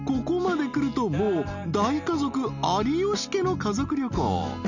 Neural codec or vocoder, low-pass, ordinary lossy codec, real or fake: none; 7.2 kHz; none; real